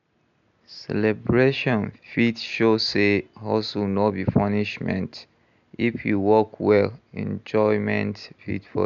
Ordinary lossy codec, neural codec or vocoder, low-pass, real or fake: none; none; 7.2 kHz; real